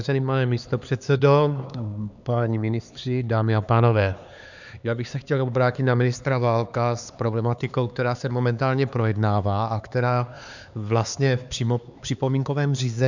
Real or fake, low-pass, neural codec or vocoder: fake; 7.2 kHz; codec, 16 kHz, 4 kbps, X-Codec, HuBERT features, trained on LibriSpeech